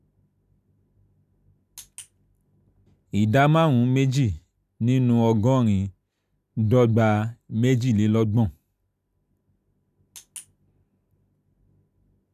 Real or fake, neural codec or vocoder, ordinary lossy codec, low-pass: real; none; none; 14.4 kHz